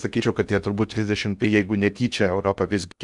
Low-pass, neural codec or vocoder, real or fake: 10.8 kHz; codec, 16 kHz in and 24 kHz out, 0.8 kbps, FocalCodec, streaming, 65536 codes; fake